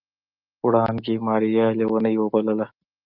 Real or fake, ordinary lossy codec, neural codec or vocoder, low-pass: real; Opus, 24 kbps; none; 5.4 kHz